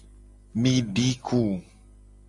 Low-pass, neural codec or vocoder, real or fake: 10.8 kHz; none; real